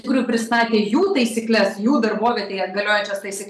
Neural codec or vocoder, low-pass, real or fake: none; 14.4 kHz; real